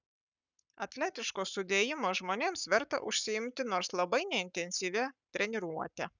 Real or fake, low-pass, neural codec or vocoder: fake; 7.2 kHz; codec, 44.1 kHz, 7.8 kbps, Pupu-Codec